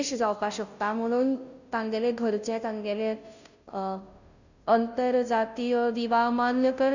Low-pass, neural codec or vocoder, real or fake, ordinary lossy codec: 7.2 kHz; codec, 16 kHz, 0.5 kbps, FunCodec, trained on Chinese and English, 25 frames a second; fake; none